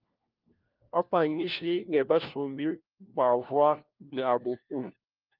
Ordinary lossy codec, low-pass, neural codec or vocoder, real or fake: Opus, 32 kbps; 5.4 kHz; codec, 16 kHz, 1 kbps, FunCodec, trained on LibriTTS, 50 frames a second; fake